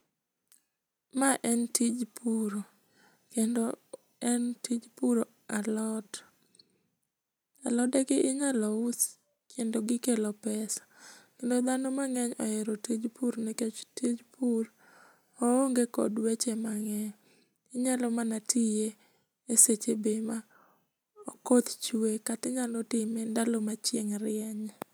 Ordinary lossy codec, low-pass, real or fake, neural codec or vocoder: none; none; real; none